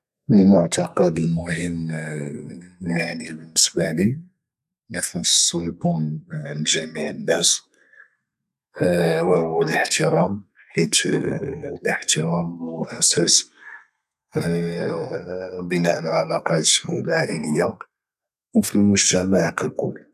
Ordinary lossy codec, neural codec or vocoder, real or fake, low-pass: none; codec, 32 kHz, 1.9 kbps, SNAC; fake; 14.4 kHz